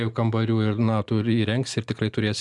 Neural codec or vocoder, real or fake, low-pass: none; real; 10.8 kHz